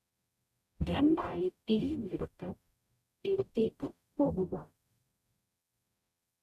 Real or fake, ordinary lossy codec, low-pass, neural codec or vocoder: fake; none; 14.4 kHz; codec, 44.1 kHz, 0.9 kbps, DAC